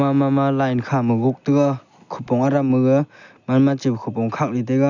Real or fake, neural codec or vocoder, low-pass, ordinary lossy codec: real; none; 7.2 kHz; none